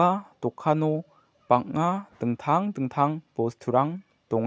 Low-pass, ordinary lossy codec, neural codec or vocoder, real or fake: none; none; none; real